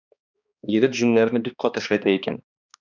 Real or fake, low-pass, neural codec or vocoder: fake; 7.2 kHz; codec, 16 kHz, 2 kbps, X-Codec, HuBERT features, trained on balanced general audio